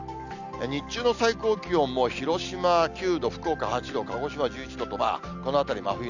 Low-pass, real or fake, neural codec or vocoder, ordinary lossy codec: 7.2 kHz; real; none; none